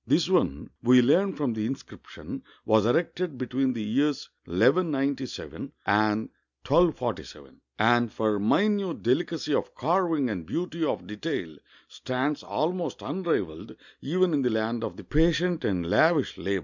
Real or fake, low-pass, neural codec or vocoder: real; 7.2 kHz; none